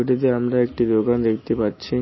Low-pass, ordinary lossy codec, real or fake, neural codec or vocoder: 7.2 kHz; MP3, 24 kbps; real; none